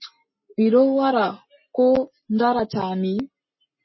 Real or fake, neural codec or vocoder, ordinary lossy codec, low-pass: real; none; MP3, 24 kbps; 7.2 kHz